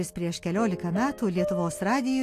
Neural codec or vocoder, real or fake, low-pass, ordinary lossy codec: none; real; 14.4 kHz; AAC, 64 kbps